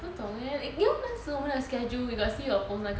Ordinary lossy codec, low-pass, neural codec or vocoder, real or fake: none; none; none; real